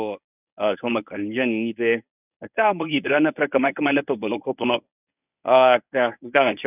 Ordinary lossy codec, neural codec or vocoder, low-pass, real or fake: none; codec, 24 kHz, 0.9 kbps, WavTokenizer, medium speech release version 1; 3.6 kHz; fake